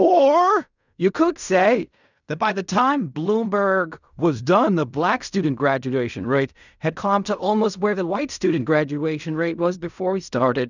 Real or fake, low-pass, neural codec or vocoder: fake; 7.2 kHz; codec, 16 kHz in and 24 kHz out, 0.4 kbps, LongCat-Audio-Codec, fine tuned four codebook decoder